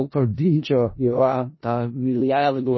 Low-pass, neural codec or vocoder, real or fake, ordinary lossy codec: 7.2 kHz; codec, 16 kHz in and 24 kHz out, 0.4 kbps, LongCat-Audio-Codec, four codebook decoder; fake; MP3, 24 kbps